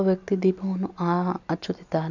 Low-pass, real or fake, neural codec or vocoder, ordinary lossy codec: 7.2 kHz; real; none; none